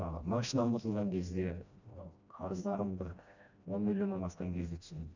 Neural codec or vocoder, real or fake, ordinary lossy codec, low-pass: codec, 16 kHz, 1 kbps, FreqCodec, smaller model; fake; none; 7.2 kHz